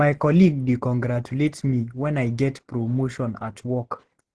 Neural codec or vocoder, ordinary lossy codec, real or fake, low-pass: none; Opus, 16 kbps; real; 10.8 kHz